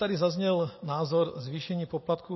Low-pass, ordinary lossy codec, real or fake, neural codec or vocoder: 7.2 kHz; MP3, 24 kbps; real; none